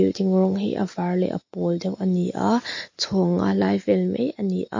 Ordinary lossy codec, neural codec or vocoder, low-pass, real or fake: MP3, 32 kbps; none; 7.2 kHz; real